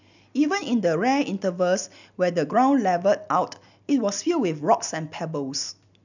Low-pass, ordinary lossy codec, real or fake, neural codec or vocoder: 7.2 kHz; none; real; none